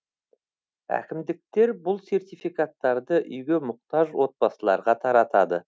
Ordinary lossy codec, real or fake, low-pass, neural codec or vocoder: none; real; none; none